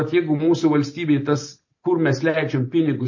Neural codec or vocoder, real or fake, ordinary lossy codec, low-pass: none; real; MP3, 32 kbps; 7.2 kHz